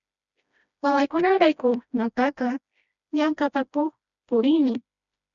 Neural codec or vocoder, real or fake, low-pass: codec, 16 kHz, 1 kbps, FreqCodec, smaller model; fake; 7.2 kHz